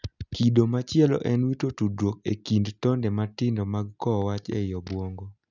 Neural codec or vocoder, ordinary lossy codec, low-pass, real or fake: none; none; 7.2 kHz; real